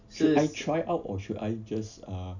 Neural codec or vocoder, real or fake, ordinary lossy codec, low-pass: none; real; none; 7.2 kHz